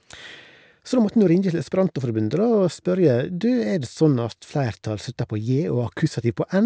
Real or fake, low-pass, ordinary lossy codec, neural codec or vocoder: real; none; none; none